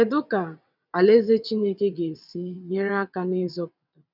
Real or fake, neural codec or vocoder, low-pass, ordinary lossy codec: fake; vocoder, 22.05 kHz, 80 mel bands, WaveNeXt; 5.4 kHz; none